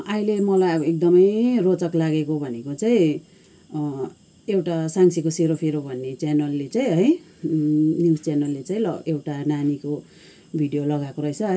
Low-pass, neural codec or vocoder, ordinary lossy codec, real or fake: none; none; none; real